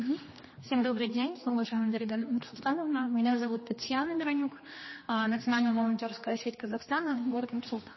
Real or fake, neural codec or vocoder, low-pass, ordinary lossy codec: fake; codec, 16 kHz, 2 kbps, X-Codec, HuBERT features, trained on general audio; 7.2 kHz; MP3, 24 kbps